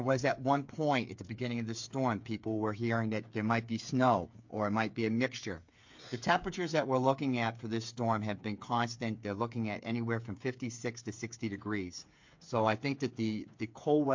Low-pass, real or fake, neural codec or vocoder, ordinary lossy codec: 7.2 kHz; fake; codec, 16 kHz, 8 kbps, FreqCodec, smaller model; MP3, 48 kbps